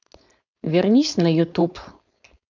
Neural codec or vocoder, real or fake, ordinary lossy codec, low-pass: codec, 16 kHz, 4.8 kbps, FACodec; fake; none; 7.2 kHz